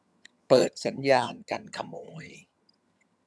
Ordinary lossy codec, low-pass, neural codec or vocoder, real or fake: none; none; vocoder, 22.05 kHz, 80 mel bands, HiFi-GAN; fake